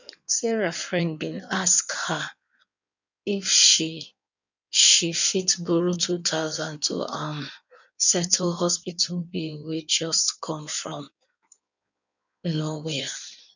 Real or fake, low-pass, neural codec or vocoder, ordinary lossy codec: fake; 7.2 kHz; codec, 16 kHz in and 24 kHz out, 1.1 kbps, FireRedTTS-2 codec; none